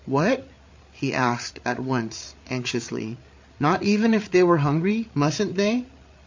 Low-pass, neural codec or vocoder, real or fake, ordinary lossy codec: 7.2 kHz; codec, 16 kHz, 8 kbps, FreqCodec, larger model; fake; MP3, 48 kbps